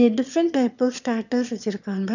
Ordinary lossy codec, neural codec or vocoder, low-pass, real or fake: none; autoencoder, 22.05 kHz, a latent of 192 numbers a frame, VITS, trained on one speaker; 7.2 kHz; fake